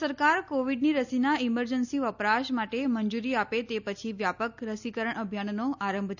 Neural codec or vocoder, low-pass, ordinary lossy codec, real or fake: none; 7.2 kHz; none; real